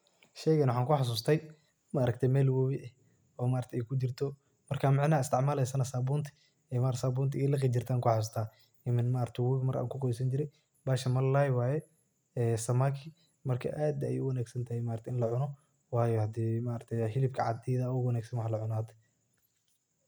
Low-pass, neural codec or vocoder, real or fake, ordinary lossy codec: none; none; real; none